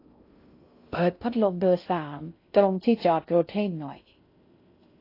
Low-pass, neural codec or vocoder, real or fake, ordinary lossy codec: 5.4 kHz; codec, 16 kHz in and 24 kHz out, 0.6 kbps, FocalCodec, streaming, 2048 codes; fake; AAC, 32 kbps